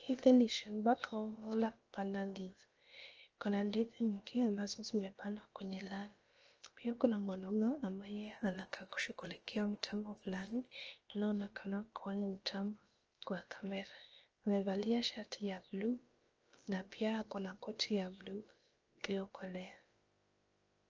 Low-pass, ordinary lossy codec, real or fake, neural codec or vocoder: 7.2 kHz; Opus, 24 kbps; fake; codec, 16 kHz, about 1 kbps, DyCAST, with the encoder's durations